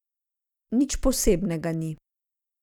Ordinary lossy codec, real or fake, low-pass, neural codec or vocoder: none; real; 19.8 kHz; none